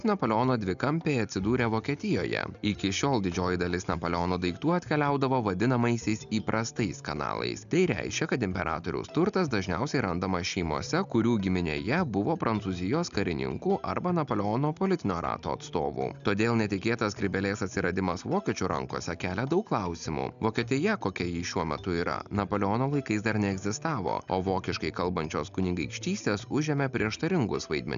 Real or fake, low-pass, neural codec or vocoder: real; 7.2 kHz; none